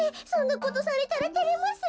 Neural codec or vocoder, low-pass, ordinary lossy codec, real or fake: none; none; none; real